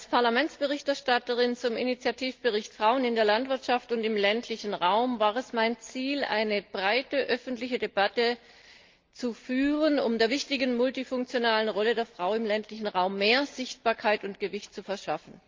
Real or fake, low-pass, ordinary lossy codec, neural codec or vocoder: real; 7.2 kHz; Opus, 32 kbps; none